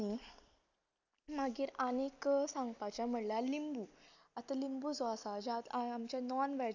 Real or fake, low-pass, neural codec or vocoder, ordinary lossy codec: real; 7.2 kHz; none; none